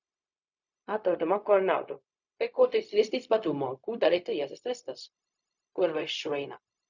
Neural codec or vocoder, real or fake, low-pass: codec, 16 kHz, 0.4 kbps, LongCat-Audio-Codec; fake; 7.2 kHz